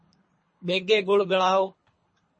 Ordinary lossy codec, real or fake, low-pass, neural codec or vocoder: MP3, 32 kbps; fake; 9.9 kHz; codec, 24 kHz, 3 kbps, HILCodec